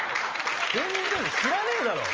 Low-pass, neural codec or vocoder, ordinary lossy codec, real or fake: 7.2 kHz; none; Opus, 24 kbps; real